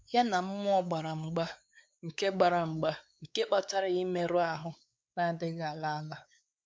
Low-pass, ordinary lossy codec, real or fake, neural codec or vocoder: none; none; fake; codec, 16 kHz, 4 kbps, X-Codec, WavLM features, trained on Multilingual LibriSpeech